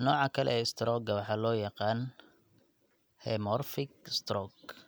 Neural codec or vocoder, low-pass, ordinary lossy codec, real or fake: none; none; none; real